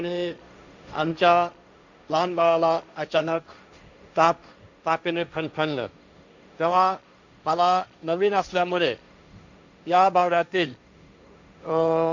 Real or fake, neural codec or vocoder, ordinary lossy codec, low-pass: fake; codec, 16 kHz, 1.1 kbps, Voila-Tokenizer; none; 7.2 kHz